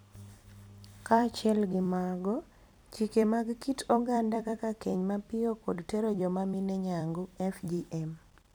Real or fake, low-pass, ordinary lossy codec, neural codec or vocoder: fake; none; none; vocoder, 44.1 kHz, 128 mel bands every 256 samples, BigVGAN v2